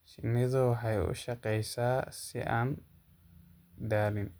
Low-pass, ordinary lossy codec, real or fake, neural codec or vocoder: none; none; real; none